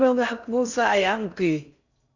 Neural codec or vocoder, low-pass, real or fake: codec, 16 kHz in and 24 kHz out, 0.6 kbps, FocalCodec, streaming, 4096 codes; 7.2 kHz; fake